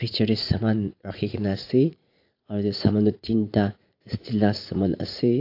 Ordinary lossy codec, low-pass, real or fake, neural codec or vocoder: AAC, 32 kbps; 5.4 kHz; fake; vocoder, 44.1 kHz, 80 mel bands, Vocos